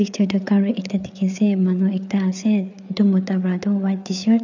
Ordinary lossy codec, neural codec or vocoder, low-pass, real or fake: none; codec, 16 kHz, 4 kbps, FreqCodec, larger model; 7.2 kHz; fake